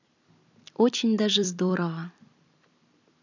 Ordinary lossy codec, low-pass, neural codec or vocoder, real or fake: none; 7.2 kHz; vocoder, 44.1 kHz, 128 mel bands every 256 samples, BigVGAN v2; fake